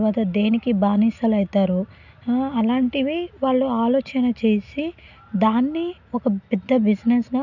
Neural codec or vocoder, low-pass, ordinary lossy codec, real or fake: none; 7.2 kHz; none; real